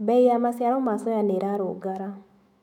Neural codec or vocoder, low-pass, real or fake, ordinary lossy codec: autoencoder, 48 kHz, 128 numbers a frame, DAC-VAE, trained on Japanese speech; 19.8 kHz; fake; MP3, 96 kbps